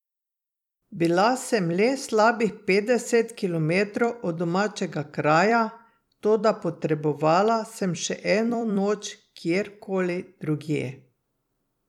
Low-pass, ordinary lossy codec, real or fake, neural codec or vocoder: 19.8 kHz; none; fake; vocoder, 44.1 kHz, 128 mel bands every 256 samples, BigVGAN v2